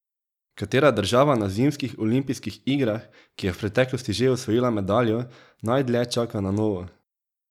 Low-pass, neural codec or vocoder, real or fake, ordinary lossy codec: 19.8 kHz; none; real; none